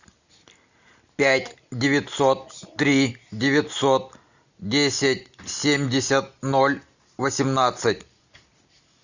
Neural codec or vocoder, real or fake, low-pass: none; real; 7.2 kHz